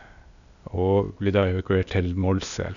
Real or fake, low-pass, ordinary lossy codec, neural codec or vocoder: fake; 7.2 kHz; none; codec, 16 kHz, 0.8 kbps, ZipCodec